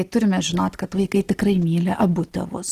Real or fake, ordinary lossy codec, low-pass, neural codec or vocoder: real; Opus, 16 kbps; 14.4 kHz; none